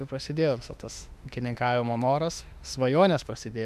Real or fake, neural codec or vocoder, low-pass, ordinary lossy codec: fake; autoencoder, 48 kHz, 32 numbers a frame, DAC-VAE, trained on Japanese speech; 14.4 kHz; AAC, 96 kbps